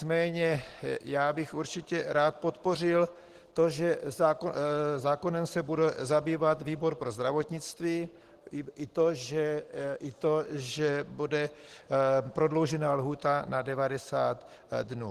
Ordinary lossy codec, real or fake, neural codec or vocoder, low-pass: Opus, 16 kbps; real; none; 14.4 kHz